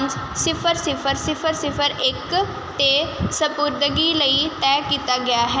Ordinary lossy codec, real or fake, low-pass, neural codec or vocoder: none; real; none; none